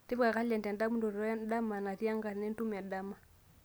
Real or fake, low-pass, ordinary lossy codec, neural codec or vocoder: real; none; none; none